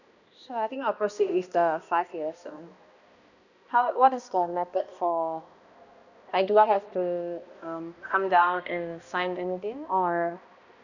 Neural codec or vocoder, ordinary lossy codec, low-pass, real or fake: codec, 16 kHz, 1 kbps, X-Codec, HuBERT features, trained on balanced general audio; none; 7.2 kHz; fake